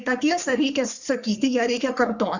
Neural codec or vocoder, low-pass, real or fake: codec, 44.1 kHz, 3.4 kbps, Pupu-Codec; 7.2 kHz; fake